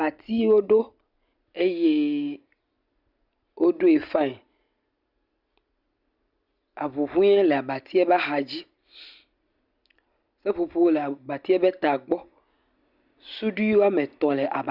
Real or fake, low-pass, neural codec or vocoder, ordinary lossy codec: real; 5.4 kHz; none; Opus, 64 kbps